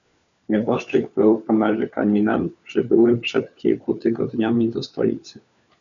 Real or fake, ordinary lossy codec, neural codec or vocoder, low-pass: fake; AAC, 96 kbps; codec, 16 kHz, 4 kbps, FunCodec, trained on LibriTTS, 50 frames a second; 7.2 kHz